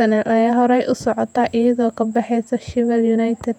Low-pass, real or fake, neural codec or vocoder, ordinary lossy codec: 19.8 kHz; fake; vocoder, 48 kHz, 128 mel bands, Vocos; none